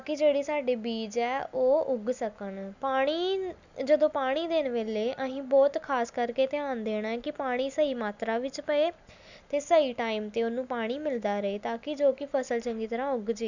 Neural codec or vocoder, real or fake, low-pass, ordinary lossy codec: none; real; 7.2 kHz; none